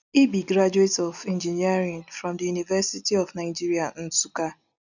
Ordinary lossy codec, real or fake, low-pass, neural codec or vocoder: none; real; 7.2 kHz; none